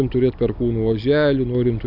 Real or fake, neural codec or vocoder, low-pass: real; none; 5.4 kHz